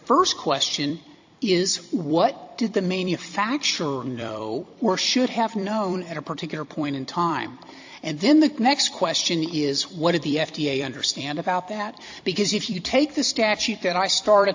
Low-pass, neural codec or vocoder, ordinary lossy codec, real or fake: 7.2 kHz; vocoder, 44.1 kHz, 128 mel bands every 256 samples, BigVGAN v2; AAC, 48 kbps; fake